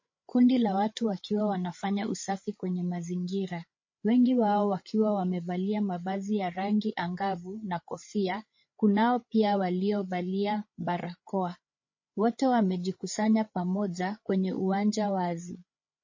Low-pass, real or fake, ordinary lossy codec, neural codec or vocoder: 7.2 kHz; fake; MP3, 32 kbps; codec, 16 kHz, 8 kbps, FreqCodec, larger model